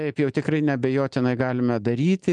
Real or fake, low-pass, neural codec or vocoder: real; 10.8 kHz; none